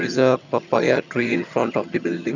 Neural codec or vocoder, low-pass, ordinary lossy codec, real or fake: vocoder, 22.05 kHz, 80 mel bands, HiFi-GAN; 7.2 kHz; none; fake